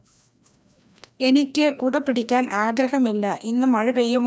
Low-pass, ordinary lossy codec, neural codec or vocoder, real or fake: none; none; codec, 16 kHz, 1 kbps, FreqCodec, larger model; fake